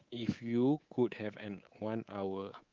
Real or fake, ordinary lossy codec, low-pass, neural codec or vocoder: fake; Opus, 32 kbps; 7.2 kHz; codec, 24 kHz, 3.1 kbps, DualCodec